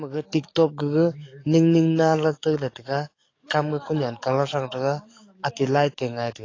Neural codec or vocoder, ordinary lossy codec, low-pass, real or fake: codec, 44.1 kHz, 7.8 kbps, DAC; AAC, 32 kbps; 7.2 kHz; fake